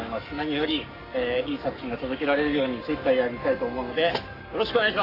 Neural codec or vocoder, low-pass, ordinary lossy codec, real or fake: codec, 44.1 kHz, 7.8 kbps, Pupu-Codec; 5.4 kHz; AAC, 32 kbps; fake